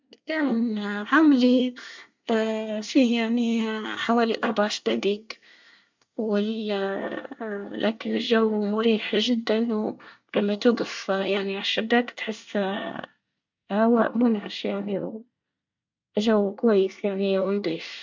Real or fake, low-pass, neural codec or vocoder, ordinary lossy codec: fake; 7.2 kHz; codec, 24 kHz, 1 kbps, SNAC; MP3, 64 kbps